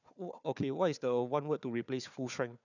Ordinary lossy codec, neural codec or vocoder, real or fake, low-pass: none; codec, 16 kHz, 4 kbps, FunCodec, trained on Chinese and English, 50 frames a second; fake; 7.2 kHz